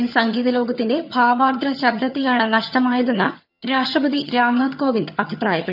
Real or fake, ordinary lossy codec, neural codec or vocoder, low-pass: fake; none; vocoder, 22.05 kHz, 80 mel bands, HiFi-GAN; 5.4 kHz